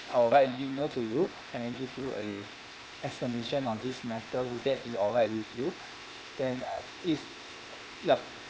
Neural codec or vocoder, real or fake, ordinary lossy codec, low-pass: codec, 16 kHz, 0.8 kbps, ZipCodec; fake; none; none